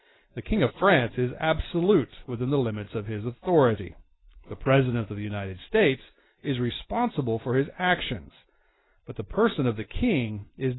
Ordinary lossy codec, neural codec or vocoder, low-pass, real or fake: AAC, 16 kbps; none; 7.2 kHz; real